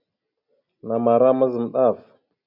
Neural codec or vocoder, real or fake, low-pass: none; real; 5.4 kHz